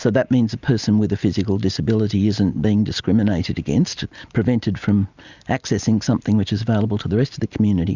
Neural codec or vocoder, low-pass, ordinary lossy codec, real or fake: none; 7.2 kHz; Opus, 64 kbps; real